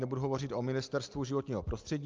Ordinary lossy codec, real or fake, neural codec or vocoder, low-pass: Opus, 24 kbps; real; none; 7.2 kHz